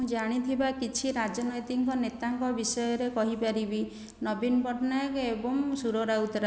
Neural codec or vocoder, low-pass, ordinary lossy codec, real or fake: none; none; none; real